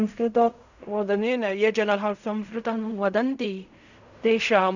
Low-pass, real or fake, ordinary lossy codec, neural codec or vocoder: 7.2 kHz; fake; none; codec, 16 kHz in and 24 kHz out, 0.4 kbps, LongCat-Audio-Codec, fine tuned four codebook decoder